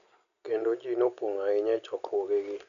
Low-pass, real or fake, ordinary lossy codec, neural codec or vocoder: 7.2 kHz; real; none; none